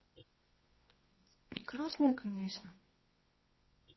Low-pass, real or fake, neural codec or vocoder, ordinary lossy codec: 7.2 kHz; fake; codec, 24 kHz, 0.9 kbps, WavTokenizer, medium music audio release; MP3, 24 kbps